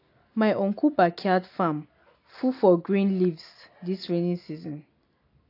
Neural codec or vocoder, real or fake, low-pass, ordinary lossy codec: none; real; 5.4 kHz; AAC, 32 kbps